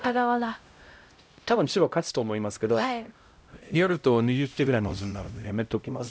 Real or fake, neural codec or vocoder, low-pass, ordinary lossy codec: fake; codec, 16 kHz, 0.5 kbps, X-Codec, HuBERT features, trained on LibriSpeech; none; none